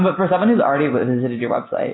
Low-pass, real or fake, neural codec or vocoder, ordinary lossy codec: 7.2 kHz; real; none; AAC, 16 kbps